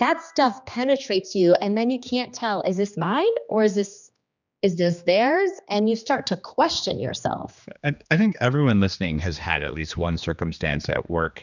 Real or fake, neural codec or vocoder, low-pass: fake; codec, 16 kHz, 2 kbps, X-Codec, HuBERT features, trained on general audio; 7.2 kHz